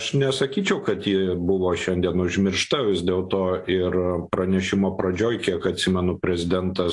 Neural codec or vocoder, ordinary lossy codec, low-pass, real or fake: none; AAC, 48 kbps; 10.8 kHz; real